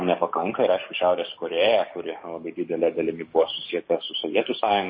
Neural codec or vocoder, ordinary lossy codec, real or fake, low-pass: codec, 44.1 kHz, 7.8 kbps, DAC; MP3, 24 kbps; fake; 7.2 kHz